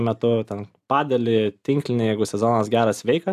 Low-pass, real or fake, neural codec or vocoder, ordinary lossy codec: 14.4 kHz; real; none; AAC, 96 kbps